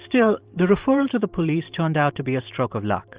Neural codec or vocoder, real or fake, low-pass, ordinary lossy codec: vocoder, 44.1 kHz, 128 mel bands every 512 samples, BigVGAN v2; fake; 3.6 kHz; Opus, 32 kbps